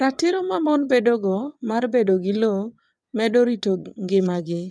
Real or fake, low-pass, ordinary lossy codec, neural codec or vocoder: fake; none; none; vocoder, 22.05 kHz, 80 mel bands, WaveNeXt